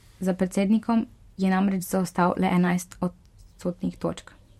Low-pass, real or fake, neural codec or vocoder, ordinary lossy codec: 19.8 kHz; fake; vocoder, 44.1 kHz, 128 mel bands every 512 samples, BigVGAN v2; MP3, 64 kbps